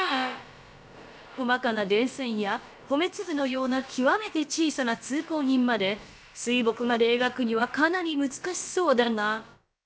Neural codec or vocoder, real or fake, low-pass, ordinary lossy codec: codec, 16 kHz, about 1 kbps, DyCAST, with the encoder's durations; fake; none; none